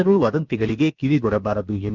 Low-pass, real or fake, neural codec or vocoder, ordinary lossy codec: 7.2 kHz; fake; codec, 16 kHz, about 1 kbps, DyCAST, with the encoder's durations; none